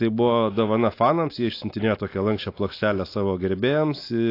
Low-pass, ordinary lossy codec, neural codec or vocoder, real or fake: 5.4 kHz; AAC, 32 kbps; none; real